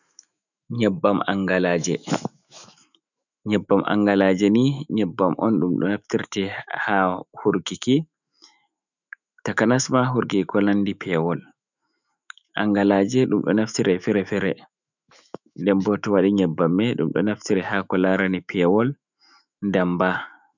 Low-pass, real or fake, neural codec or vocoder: 7.2 kHz; fake; autoencoder, 48 kHz, 128 numbers a frame, DAC-VAE, trained on Japanese speech